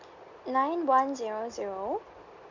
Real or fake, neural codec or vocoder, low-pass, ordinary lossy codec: real; none; 7.2 kHz; none